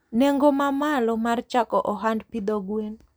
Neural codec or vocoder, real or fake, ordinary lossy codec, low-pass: none; real; none; none